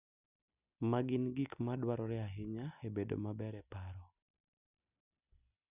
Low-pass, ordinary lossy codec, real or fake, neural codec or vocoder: 3.6 kHz; none; real; none